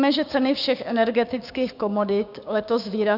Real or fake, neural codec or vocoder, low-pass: fake; codec, 16 kHz, 6 kbps, DAC; 5.4 kHz